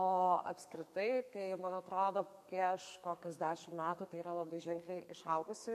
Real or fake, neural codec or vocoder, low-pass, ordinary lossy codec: fake; codec, 32 kHz, 1.9 kbps, SNAC; 14.4 kHz; MP3, 64 kbps